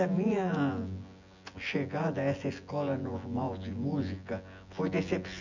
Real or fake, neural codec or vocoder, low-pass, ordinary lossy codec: fake; vocoder, 24 kHz, 100 mel bands, Vocos; 7.2 kHz; none